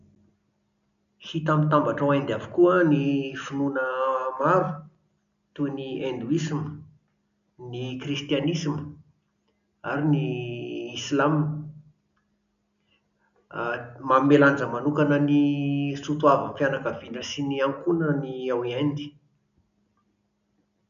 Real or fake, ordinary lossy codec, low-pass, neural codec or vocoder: real; none; 7.2 kHz; none